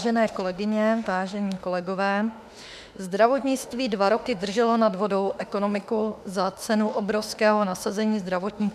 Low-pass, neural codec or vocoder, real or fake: 14.4 kHz; autoencoder, 48 kHz, 32 numbers a frame, DAC-VAE, trained on Japanese speech; fake